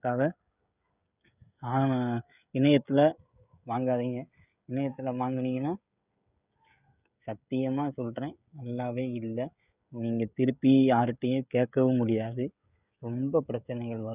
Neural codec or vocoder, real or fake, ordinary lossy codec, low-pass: codec, 16 kHz, 16 kbps, FreqCodec, smaller model; fake; none; 3.6 kHz